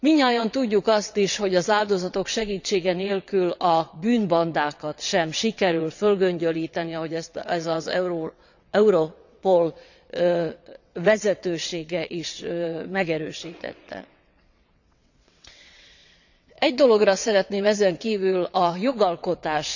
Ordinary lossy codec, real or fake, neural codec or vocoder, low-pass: none; fake; vocoder, 22.05 kHz, 80 mel bands, WaveNeXt; 7.2 kHz